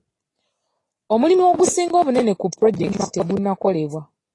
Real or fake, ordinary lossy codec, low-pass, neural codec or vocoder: real; AAC, 32 kbps; 10.8 kHz; none